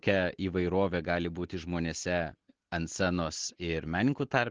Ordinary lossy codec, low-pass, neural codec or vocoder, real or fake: Opus, 16 kbps; 7.2 kHz; none; real